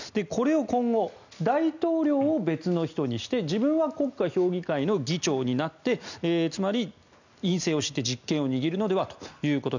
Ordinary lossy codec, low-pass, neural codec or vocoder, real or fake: none; 7.2 kHz; none; real